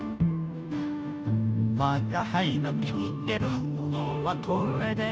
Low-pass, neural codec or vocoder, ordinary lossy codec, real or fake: none; codec, 16 kHz, 0.5 kbps, FunCodec, trained on Chinese and English, 25 frames a second; none; fake